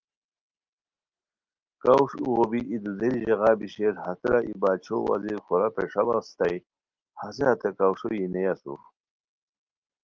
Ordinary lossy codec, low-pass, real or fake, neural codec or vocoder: Opus, 32 kbps; 7.2 kHz; real; none